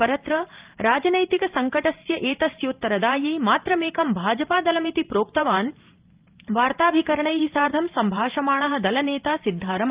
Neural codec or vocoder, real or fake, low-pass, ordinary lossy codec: vocoder, 44.1 kHz, 128 mel bands every 512 samples, BigVGAN v2; fake; 3.6 kHz; Opus, 32 kbps